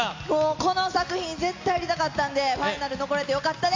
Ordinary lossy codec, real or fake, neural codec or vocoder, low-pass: AAC, 48 kbps; real; none; 7.2 kHz